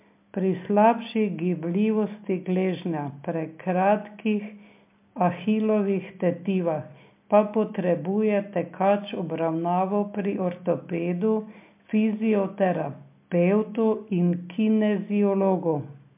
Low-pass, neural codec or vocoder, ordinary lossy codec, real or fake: 3.6 kHz; none; MP3, 32 kbps; real